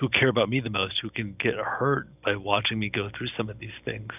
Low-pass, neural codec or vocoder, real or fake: 3.6 kHz; none; real